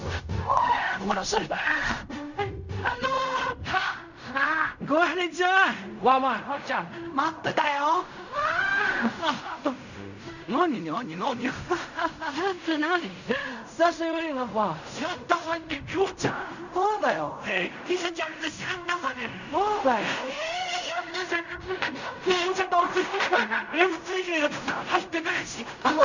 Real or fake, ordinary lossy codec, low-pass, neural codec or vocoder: fake; none; 7.2 kHz; codec, 16 kHz in and 24 kHz out, 0.4 kbps, LongCat-Audio-Codec, fine tuned four codebook decoder